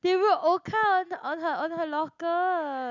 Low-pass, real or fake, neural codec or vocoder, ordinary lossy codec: 7.2 kHz; real; none; none